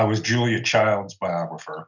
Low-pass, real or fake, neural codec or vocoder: 7.2 kHz; real; none